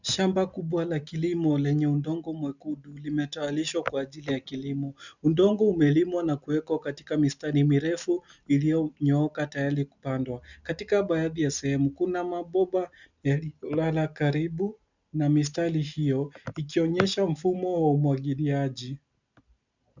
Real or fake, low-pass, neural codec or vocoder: real; 7.2 kHz; none